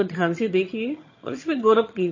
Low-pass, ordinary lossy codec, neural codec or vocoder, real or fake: 7.2 kHz; MP3, 32 kbps; vocoder, 22.05 kHz, 80 mel bands, HiFi-GAN; fake